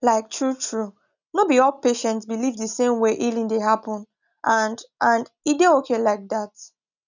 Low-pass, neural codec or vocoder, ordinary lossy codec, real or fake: 7.2 kHz; none; none; real